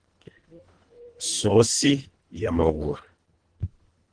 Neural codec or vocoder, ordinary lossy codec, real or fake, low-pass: codec, 24 kHz, 1.5 kbps, HILCodec; Opus, 16 kbps; fake; 9.9 kHz